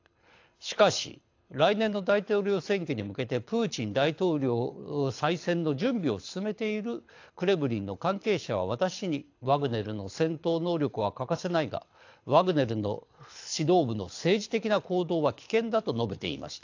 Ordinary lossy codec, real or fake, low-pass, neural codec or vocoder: AAC, 48 kbps; fake; 7.2 kHz; codec, 24 kHz, 6 kbps, HILCodec